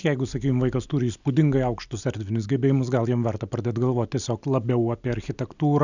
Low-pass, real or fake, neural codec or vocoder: 7.2 kHz; real; none